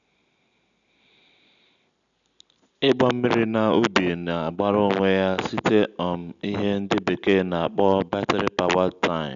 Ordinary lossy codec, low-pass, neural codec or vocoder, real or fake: none; 7.2 kHz; none; real